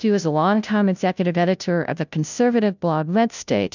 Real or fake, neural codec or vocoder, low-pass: fake; codec, 16 kHz, 0.5 kbps, FunCodec, trained on Chinese and English, 25 frames a second; 7.2 kHz